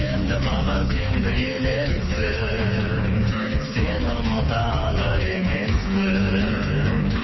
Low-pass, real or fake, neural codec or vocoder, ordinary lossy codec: 7.2 kHz; fake; codec, 16 kHz, 4 kbps, FreqCodec, smaller model; MP3, 24 kbps